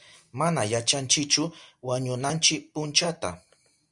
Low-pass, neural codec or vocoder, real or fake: 10.8 kHz; none; real